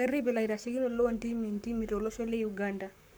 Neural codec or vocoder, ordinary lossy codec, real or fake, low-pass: codec, 44.1 kHz, 7.8 kbps, Pupu-Codec; none; fake; none